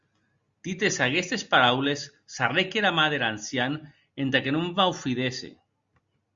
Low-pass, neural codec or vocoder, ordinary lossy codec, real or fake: 7.2 kHz; none; Opus, 64 kbps; real